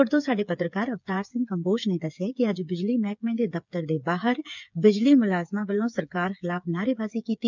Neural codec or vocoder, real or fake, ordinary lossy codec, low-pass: codec, 16 kHz, 8 kbps, FreqCodec, smaller model; fake; none; 7.2 kHz